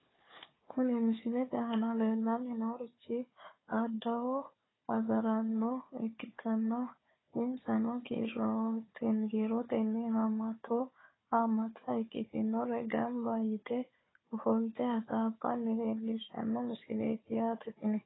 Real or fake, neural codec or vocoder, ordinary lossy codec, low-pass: fake; codec, 16 kHz, 4 kbps, FunCodec, trained on Chinese and English, 50 frames a second; AAC, 16 kbps; 7.2 kHz